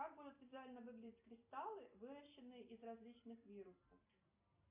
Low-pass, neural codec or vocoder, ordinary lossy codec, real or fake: 3.6 kHz; none; AAC, 32 kbps; real